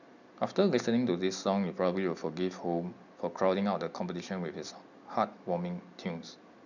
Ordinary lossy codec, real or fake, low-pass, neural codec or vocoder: none; real; 7.2 kHz; none